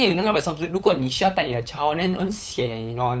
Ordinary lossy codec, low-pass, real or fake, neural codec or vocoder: none; none; fake; codec, 16 kHz, 8 kbps, FunCodec, trained on LibriTTS, 25 frames a second